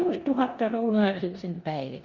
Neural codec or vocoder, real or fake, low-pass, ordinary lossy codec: codec, 16 kHz in and 24 kHz out, 0.9 kbps, LongCat-Audio-Codec, four codebook decoder; fake; 7.2 kHz; Opus, 64 kbps